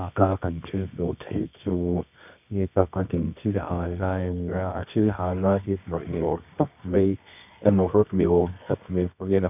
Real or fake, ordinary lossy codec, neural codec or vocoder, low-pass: fake; none; codec, 24 kHz, 0.9 kbps, WavTokenizer, medium music audio release; 3.6 kHz